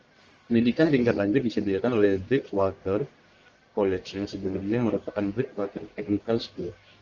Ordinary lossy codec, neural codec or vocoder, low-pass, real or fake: Opus, 24 kbps; codec, 44.1 kHz, 1.7 kbps, Pupu-Codec; 7.2 kHz; fake